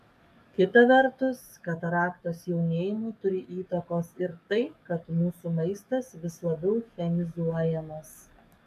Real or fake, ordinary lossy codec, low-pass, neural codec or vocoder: fake; MP3, 96 kbps; 14.4 kHz; codec, 44.1 kHz, 7.8 kbps, DAC